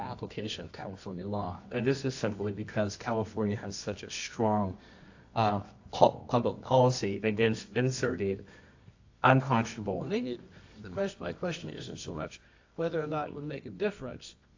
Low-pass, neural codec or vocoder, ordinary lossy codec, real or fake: 7.2 kHz; codec, 24 kHz, 0.9 kbps, WavTokenizer, medium music audio release; AAC, 48 kbps; fake